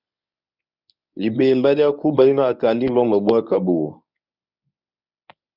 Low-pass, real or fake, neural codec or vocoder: 5.4 kHz; fake; codec, 24 kHz, 0.9 kbps, WavTokenizer, medium speech release version 1